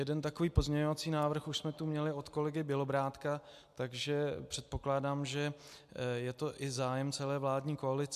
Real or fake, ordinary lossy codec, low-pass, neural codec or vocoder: real; AAC, 96 kbps; 14.4 kHz; none